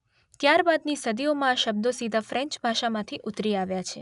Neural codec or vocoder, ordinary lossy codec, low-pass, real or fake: none; none; 10.8 kHz; real